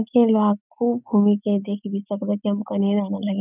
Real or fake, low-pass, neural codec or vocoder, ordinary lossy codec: real; 3.6 kHz; none; none